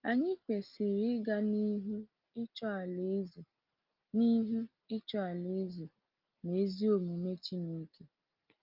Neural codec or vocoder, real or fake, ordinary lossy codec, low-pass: none; real; Opus, 24 kbps; 5.4 kHz